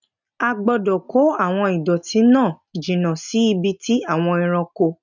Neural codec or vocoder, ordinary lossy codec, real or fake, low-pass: none; none; real; 7.2 kHz